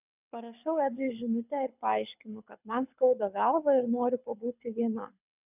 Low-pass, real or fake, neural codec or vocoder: 3.6 kHz; fake; codec, 24 kHz, 6 kbps, HILCodec